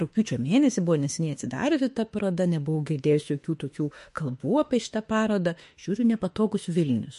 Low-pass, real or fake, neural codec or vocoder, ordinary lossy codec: 14.4 kHz; fake; autoencoder, 48 kHz, 32 numbers a frame, DAC-VAE, trained on Japanese speech; MP3, 48 kbps